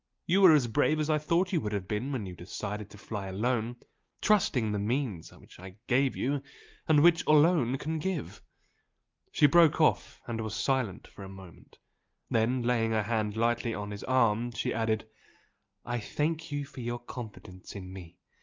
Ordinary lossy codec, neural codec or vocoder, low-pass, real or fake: Opus, 24 kbps; none; 7.2 kHz; real